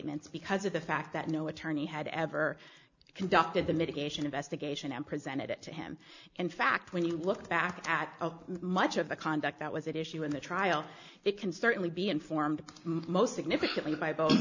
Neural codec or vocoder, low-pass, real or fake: none; 7.2 kHz; real